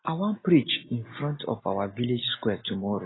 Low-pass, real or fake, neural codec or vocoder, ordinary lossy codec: 7.2 kHz; real; none; AAC, 16 kbps